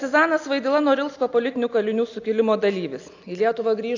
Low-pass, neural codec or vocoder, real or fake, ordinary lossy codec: 7.2 kHz; none; real; AAC, 48 kbps